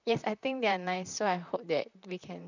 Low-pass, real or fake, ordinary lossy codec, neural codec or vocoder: 7.2 kHz; fake; none; vocoder, 44.1 kHz, 128 mel bands, Pupu-Vocoder